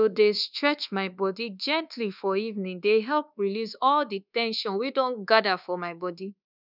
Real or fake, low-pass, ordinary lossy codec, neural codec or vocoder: fake; 5.4 kHz; none; codec, 24 kHz, 1.2 kbps, DualCodec